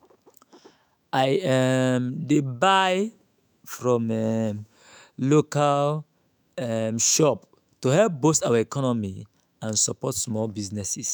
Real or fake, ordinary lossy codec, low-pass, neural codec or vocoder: fake; none; none; autoencoder, 48 kHz, 128 numbers a frame, DAC-VAE, trained on Japanese speech